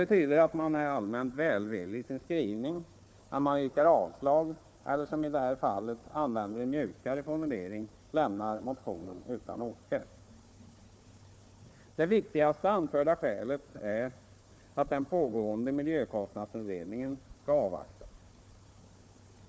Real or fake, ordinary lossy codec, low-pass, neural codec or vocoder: fake; none; none; codec, 16 kHz, 4 kbps, FunCodec, trained on Chinese and English, 50 frames a second